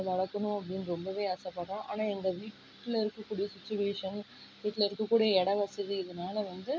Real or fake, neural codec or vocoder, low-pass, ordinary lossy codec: real; none; none; none